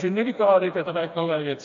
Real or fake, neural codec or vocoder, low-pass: fake; codec, 16 kHz, 2 kbps, FreqCodec, smaller model; 7.2 kHz